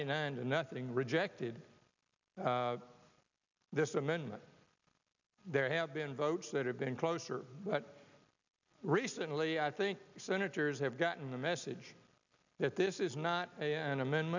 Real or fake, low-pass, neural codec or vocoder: real; 7.2 kHz; none